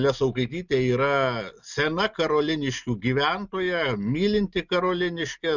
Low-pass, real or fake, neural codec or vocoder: 7.2 kHz; real; none